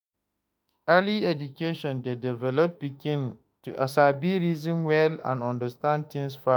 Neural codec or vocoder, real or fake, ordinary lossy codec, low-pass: autoencoder, 48 kHz, 32 numbers a frame, DAC-VAE, trained on Japanese speech; fake; none; none